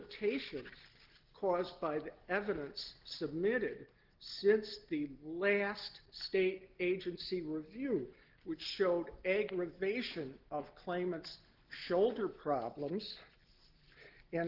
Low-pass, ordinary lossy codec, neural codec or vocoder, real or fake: 5.4 kHz; Opus, 16 kbps; none; real